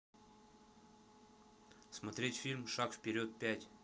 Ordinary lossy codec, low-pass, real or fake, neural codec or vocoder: none; none; real; none